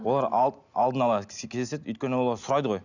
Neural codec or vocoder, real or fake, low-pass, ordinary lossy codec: none; real; 7.2 kHz; none